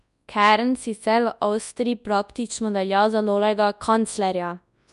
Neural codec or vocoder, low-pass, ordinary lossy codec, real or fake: codec, 24 kHz, 0.9 kbps, WavTokenizer, large speech release; 10.8 kHz; none; fake